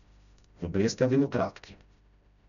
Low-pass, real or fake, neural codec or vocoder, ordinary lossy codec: 7.2 kHz; fake; codec, 16 kHz, 0.5 kbps, FreqCodec, smaller model; none